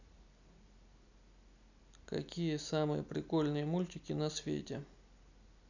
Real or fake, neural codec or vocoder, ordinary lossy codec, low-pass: real; none; none; 7.2 kHz